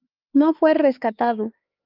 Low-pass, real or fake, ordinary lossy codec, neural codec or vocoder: 5.4 kHz; fake; Opus, 24 kbps; codec, 16 kHz, 4 kbps, X-Codec, HuBERT features, trained on LibriSpeech